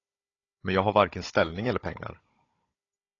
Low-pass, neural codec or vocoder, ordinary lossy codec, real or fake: 7.2 kHz; codec, 16 kHz, 16 kbps, FunCodec, trained on Chinese and English, 50 frames a second; AAC, 32 kbps; fake